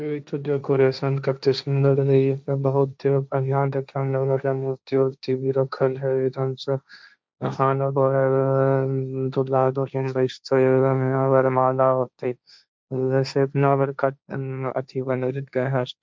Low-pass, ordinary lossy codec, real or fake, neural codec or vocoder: none; none; fake; codec, 16 kHz, 1.1 kbps, Voila-Tokenizer